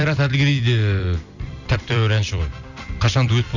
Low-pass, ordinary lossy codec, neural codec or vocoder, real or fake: 7.2 kHz; none; vocoder, 44.1 kHz, 128 mel bands every 256 samples, BigVGAN v2; fake